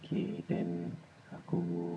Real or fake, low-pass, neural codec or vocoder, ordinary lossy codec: fake; none; vocoder, 22.05 kHz, 80 mel bands, HiFi-GAN; none